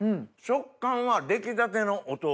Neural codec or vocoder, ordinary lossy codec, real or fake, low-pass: none; none; real; none